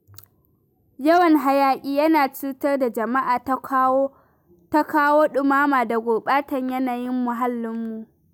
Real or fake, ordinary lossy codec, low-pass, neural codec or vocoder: real; none; none; none